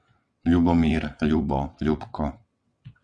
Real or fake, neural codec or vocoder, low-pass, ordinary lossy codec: fake; vocoder, 22.05 kHz, 80 mel bands, WaveNeXt; 9.9 kHz; Opus, 64 kbps